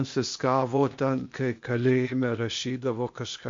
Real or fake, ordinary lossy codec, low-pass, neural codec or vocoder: fake; MP3, 48 kbps; 7.2 kHz; codec, 16 kHz, 0.8 kbps, ZipCodec